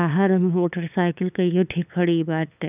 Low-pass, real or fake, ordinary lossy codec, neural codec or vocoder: 3.6 kHz; fake; none; codec, 16 kHz, 8 kbps, FunCodec, trained on LibriTTS, 25 frames a second